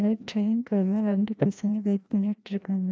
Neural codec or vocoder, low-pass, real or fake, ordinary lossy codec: codec, 16 kHz, 1 kbps, FreqCodec, larger model; none; fake; none